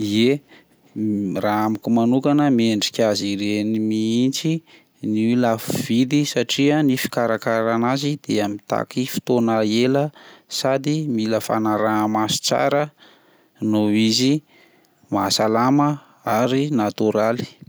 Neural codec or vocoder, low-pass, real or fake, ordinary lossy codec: none; none; real; none